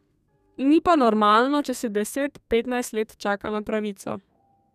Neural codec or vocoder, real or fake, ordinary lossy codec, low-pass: codec, 32 kHz, 1.9 kbps, SNAC; fake; none; 14.4 kHz